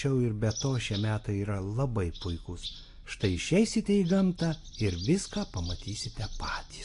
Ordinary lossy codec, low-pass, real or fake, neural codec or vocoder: AAC, 48 kbps; 10.8 kHz; real; none